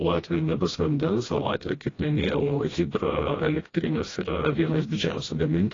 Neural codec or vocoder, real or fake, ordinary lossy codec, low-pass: codec, 16 kHz, 1 kbps, FreqCodec, smaller model; fake; AAC, 32 kbps; 7.2 kHz